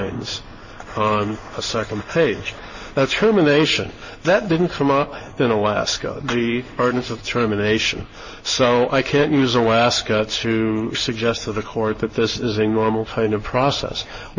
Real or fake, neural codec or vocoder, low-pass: fake; codec, 16 kHz in and 24 kHz out, 1 kbps, XY-Tokenizer; 7.2 kHz